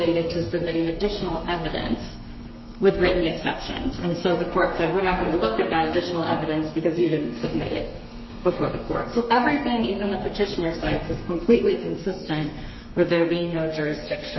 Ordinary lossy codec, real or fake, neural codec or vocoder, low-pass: MP3, 24 kbps; fake; codec, 32 kHz, 1.9 kbps, SNAC; 7.2 kHz